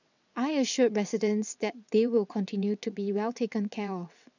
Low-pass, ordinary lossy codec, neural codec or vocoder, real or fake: 7.2 kHz; none; vocoder, 22.05 kHz, 80 mel bands, WaveNeXt; fake